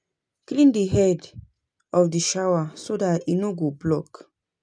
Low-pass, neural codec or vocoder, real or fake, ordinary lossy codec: 9.9 kHz; none; real; none